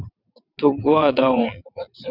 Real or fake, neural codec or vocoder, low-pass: fake; vocoder, 22.05 kHz, 80 mel bands, WaveNeXt; 5.4 kHz